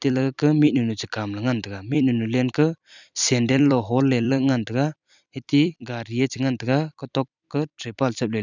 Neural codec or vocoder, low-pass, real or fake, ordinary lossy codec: vocoder, 44.1 kHz, 128 mel bands every 256 samples, BigVGAN v2; 7.2 kHz; fake; none